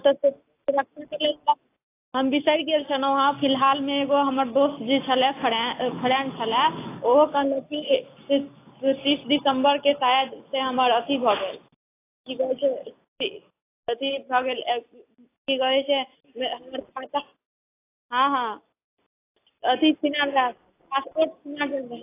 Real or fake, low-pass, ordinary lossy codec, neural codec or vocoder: real; 3.6 kHz; AAC, 24 kbps; none